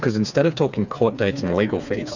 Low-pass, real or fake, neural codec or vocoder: 7.2 kHz; fake; codec, 16 kHz, 4 kbps, FreqCodec, smaller model